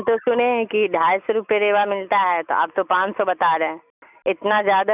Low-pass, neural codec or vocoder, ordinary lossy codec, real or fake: 3.6 kHz; none; none; real